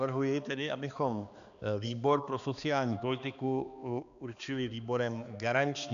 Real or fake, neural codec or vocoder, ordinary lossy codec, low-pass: fake; codec, 16 kHz, 2 kbps, X-Codec, HuBERT features, trained on balanced general audio; AAC, 96 kbps; 7.2 kHz